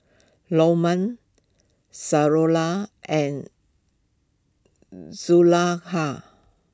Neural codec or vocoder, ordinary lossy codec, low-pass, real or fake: none; none; none; real